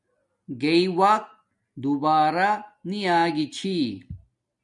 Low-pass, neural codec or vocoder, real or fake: 10.8 kHz; none; real